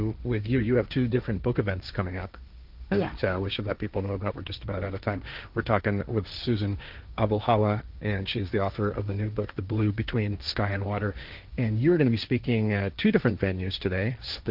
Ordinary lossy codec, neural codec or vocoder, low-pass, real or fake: Opus, 24 kbps; codec, 16 kHz, 1.1 kbps, Voila-Tokenizer; 5.4 kHz; fake